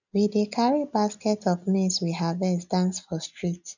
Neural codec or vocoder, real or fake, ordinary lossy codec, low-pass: none; real; none; 7.2 kHz